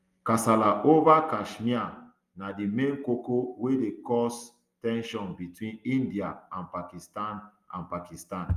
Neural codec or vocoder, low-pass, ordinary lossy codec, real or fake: none; 14.4 kHz; Opus, 32 kbps; real